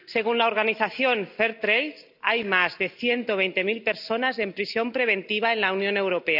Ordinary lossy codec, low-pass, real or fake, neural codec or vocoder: none; 5.4 kHz; real; none